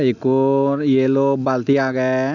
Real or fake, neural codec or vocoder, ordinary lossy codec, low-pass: real; none; none; 7.2 kHz